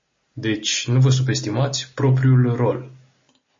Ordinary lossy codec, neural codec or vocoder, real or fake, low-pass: MP3, 32 kbps; none; real; 7.2 kHz